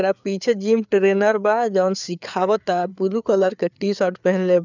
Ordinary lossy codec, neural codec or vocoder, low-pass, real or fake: none; codec, 16 kHz, 4 kbps, FreqCodec, larger model; 7.2 kHz; fake